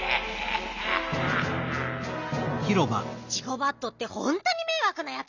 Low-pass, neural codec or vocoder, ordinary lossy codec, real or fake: 7.2 kHz; none; none; real